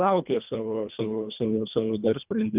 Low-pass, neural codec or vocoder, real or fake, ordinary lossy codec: 3.6 kHz; codec, 24 kHz, 1.5 kbps, HILCodec; fake; Opus, 24 kbps